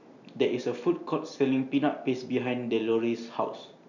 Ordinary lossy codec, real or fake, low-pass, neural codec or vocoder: none; real; 7.2 kHz; none